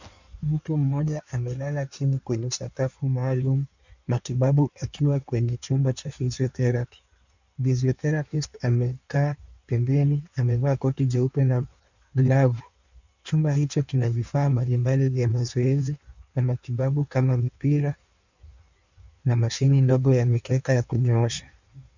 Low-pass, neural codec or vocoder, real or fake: 7.2 kHz; codec, 16 kHz in and 24 kHz out, 1.1 kbps, FireRedTTS-2 codec; fake